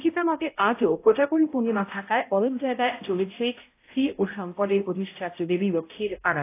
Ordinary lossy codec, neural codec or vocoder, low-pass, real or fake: AAC, 24 kbps; codec, 16 kHz, 0.5 kbps, X-Codec, HuBERT features, trained on balanced general audio; 3.6 kHz; fake